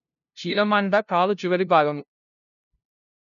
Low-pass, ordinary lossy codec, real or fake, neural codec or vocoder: 7.2 kHz; none; fake; codec, 16 kHz, 0.5 kbps, FunCodec, trained on LibriTTS, 25 frames a second